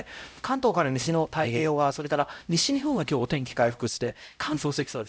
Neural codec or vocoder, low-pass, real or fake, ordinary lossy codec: codec, 16 kHz, 0.5 kbps, X-Codec, HuBERT features, trained on LibriSpeech; none; fake; none